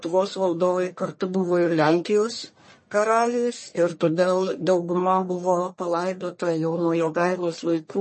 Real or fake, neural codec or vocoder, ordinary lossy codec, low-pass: fake; codec, 44.1 kHz, 1.7 kbps, Pupu-Codec; MP3, 32 kbps; 10.8 kHz